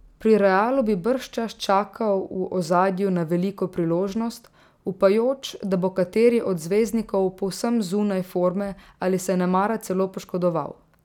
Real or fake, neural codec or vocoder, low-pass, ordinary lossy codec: real; none; 19.8 kHz; none